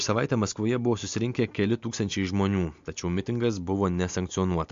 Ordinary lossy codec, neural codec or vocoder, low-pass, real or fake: MP3, 48 kbps; none; 7.2 kHz; real